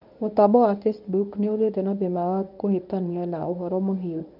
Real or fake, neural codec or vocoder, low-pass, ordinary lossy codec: fake; codec, 24 kHz, 0.9 kbps, WavTokenizer, medium speech release version 1; 5.4 kHz; none